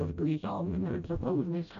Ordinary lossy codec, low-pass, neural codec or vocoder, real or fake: none; 7.2 kHz; codec, 16 kHz, 0.5 kbps, FreqCodec, smaller model; fake